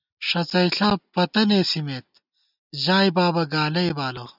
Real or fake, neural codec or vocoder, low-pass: real; none; 5.4 kHz